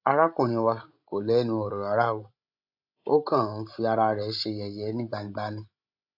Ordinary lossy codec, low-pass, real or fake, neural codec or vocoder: AAC, 48 kbps; 5.4 kHz; fake; codec, 16 kHz, 16 kbps, FreqCodec, larger model